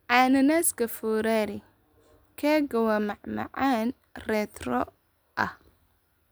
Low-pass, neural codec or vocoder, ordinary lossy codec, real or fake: none; none; none; real